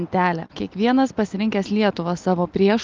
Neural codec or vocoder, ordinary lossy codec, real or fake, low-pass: none; Opus, 32 kbps; real; 7.2 kHz